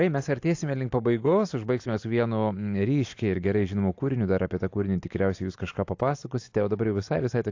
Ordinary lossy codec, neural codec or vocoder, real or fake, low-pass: AAC, 48 kbps; none; real; 7.2 kHz